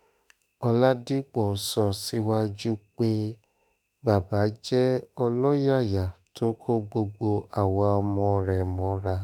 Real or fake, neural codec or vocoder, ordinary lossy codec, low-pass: fake; autoencoder, 48 kHz, 32 numbers a frame, DAC-VAE, trained on Japanese speech; none; none